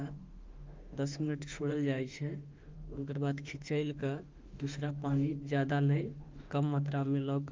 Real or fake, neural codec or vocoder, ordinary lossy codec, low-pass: fake; autoencoder, 48 kHz, 32 numbers a frame, DAC-VAE, trained on Japanese speech; Opus, 24 kbps; 7.2 kHz